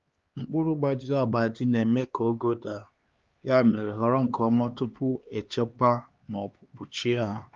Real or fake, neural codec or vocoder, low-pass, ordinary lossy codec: fake; codec, 16 kHz, 2 kbps, X-Codec, HuBERT features, trained on LibriSpeech; 7.2 kHz; Opus, 32 kbps